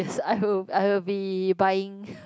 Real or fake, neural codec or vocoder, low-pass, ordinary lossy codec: real; none; none; none